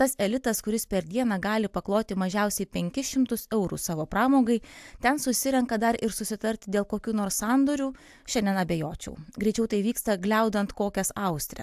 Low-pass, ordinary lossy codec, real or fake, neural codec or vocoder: 14.4 kHz; AAC, 96 kbps; real; none